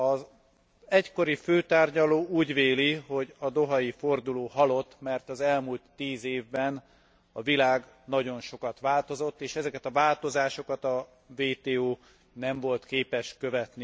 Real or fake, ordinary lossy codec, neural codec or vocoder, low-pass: real; none; none; none